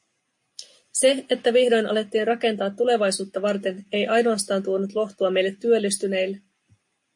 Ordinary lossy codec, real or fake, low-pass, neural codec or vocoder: MP3, 48 kbps; real; 10.8 kHz; none